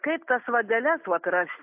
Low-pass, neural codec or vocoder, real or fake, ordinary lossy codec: 3.6 kHz; none; real; AAC, 32 kbps